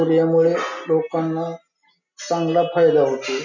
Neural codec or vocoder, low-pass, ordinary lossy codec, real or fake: none; 7.2 kHz; none; real